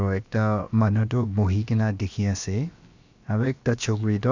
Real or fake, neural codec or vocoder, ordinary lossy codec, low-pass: fake; codec, 16 kHz, about 1 kbps, DyCAST, with the encoder's durations; Opus, 64 kbps; 7.2 kHz